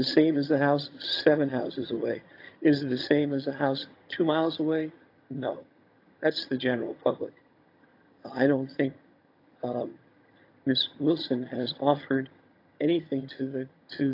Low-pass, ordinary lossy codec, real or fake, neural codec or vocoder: 5.4 kHz; AAC, 32 kbps; fake; vocoder, 22.05 kHz, 80 mel bands, HiFi-GAN